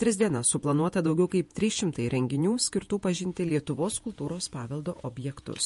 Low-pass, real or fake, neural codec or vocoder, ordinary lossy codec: 14.4 kHz; fake; vocoder, 48 kHz, 128 mel bands, Vocos; MP3, 48 kbps